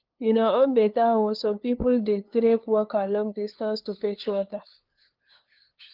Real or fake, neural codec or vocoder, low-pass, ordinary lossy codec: fake; codec, 16 kHz, 2 kbps, FunCodec, trained on LibriTTS, 25 frames a second; 5.4 kHz; Opus, 32 kbps